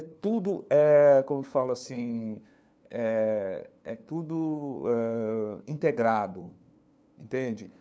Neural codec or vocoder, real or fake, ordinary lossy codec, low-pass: codec, 16 kHz, 2 kbps, FunCodec, trained on LibriTTS, 25 frames a second; fake; none; none